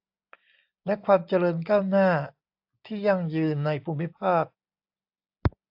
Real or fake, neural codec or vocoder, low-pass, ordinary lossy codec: real; none; 5.4 kHz; AAC, 48 kbps